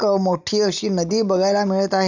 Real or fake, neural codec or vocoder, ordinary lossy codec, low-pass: real; none; none; 7.2 kHz